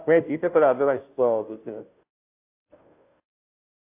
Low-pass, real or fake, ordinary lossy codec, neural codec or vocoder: 3.6 kHz; fake; AAC, 32 kbps; codec, 16 kHz, 0.5 kbps, FunCodec, trained on Chinese and English, 25 frames a second